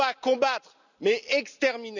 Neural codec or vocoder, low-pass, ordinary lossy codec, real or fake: none; 7.2 kHz; none; real